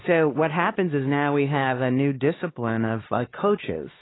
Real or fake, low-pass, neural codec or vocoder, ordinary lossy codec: fake; 7.2 kHz; codec, 16 kHz, 2 kbps, X-Codec, HuBERT features, trained on LibriSpeech; AAC, 16 kbps